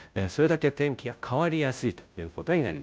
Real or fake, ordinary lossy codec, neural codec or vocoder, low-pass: fake; none; codec, 16 kHz, 0.5 kbps, FunCodec, trained on Chinese and English, 25 frames a second; none